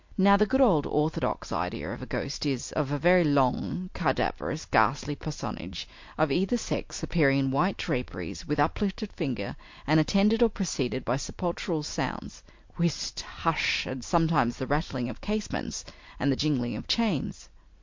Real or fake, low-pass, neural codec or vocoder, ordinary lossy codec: real; 7.2 kHz; none; MP3, 48 kbps